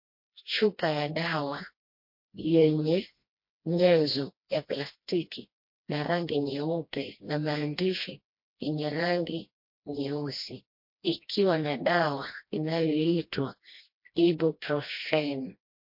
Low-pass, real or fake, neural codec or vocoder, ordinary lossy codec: 5.4 kHz; fake; codec, 16 kHz, 1 kbps, FreqCodec, smaller model; MP3, 32 kbps